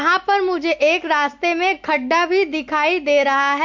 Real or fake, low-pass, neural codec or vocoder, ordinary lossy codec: real; 7.2 kHz; none; MP3, 48 kbps